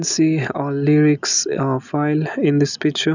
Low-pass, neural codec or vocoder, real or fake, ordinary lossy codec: 7.2 kHz; none; real; none